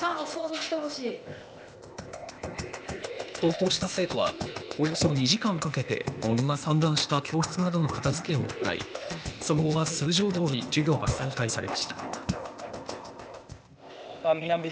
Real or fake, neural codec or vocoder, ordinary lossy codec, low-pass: fake; codec, 16 kHz, 0.8 kbps, ZipCodec; none; none